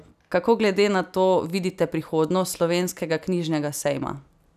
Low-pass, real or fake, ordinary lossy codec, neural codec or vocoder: 14.4 kHz; real; none; none